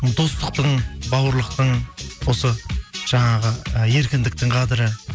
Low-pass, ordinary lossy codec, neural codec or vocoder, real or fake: none; none; none; real